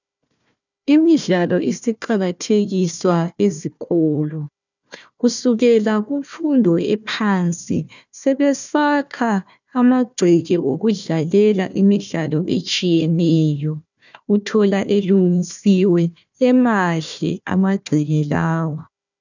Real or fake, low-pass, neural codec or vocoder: fake; 7.2 kHz; codec, 16 kHz, 1 kbps, FunCodec, trained on Chinese and English, 50 frames a second